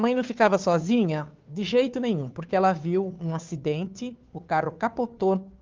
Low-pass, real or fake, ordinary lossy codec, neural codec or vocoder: 7.2 kHz; fake; Opus, 32 kbps; codec, 16 kHz, 2 kbps, FunCodec, trained on Chinese and English, 25 frames a second